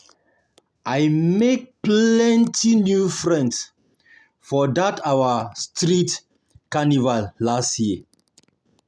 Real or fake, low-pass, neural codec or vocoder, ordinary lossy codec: real; none; none; none